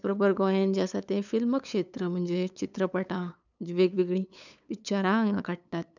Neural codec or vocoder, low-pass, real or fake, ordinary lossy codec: codec, 16 kHz, 8 kbps, FunCodec, trained on LibriTTS, 25 frames a second; 7.2 kHz; fake; none